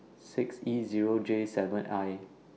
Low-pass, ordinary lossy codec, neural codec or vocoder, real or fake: none; none; none; real